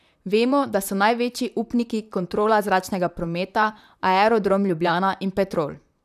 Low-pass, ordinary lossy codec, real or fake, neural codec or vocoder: 14.4 kHz; none; fake; vocoder, 44.1 kHz, 128 mel bands, Pupu-Vocoder